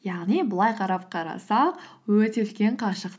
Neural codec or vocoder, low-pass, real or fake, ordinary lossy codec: none; none; real; none